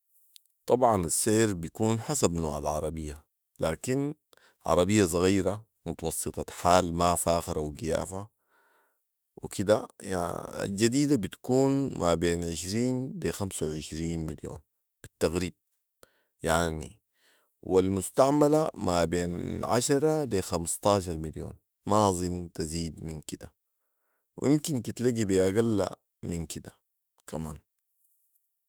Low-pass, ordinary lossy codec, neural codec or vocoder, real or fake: none; none; autoencoder, 48 kHz, 32 numbers a frame, DAC-VAE, trained on Japanese speech; fake